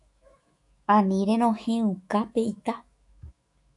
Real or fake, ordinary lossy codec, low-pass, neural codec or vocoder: fake; AAC, 64 kbps; 10.8 kHz; autoencoder, 48 kHz, 128 numbers a frame, DAC-VAE, trained on Japanese speech